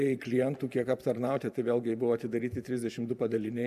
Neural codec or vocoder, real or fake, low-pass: vocoder, 44.1 kHz, 128 mel bands every 512 samples, BigVGAN v2; fake; 14.4 kHz